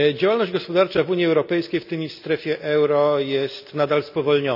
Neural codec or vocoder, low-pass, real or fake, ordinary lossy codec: none; 5.4 kHz; real; none